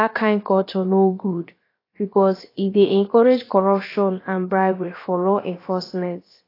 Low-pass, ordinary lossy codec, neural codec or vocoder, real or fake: 5.4 kHz; AAC, 24 kbps; codec, 16 kHz, about 1 kbps, DyCAST, with the encoder's durations; fake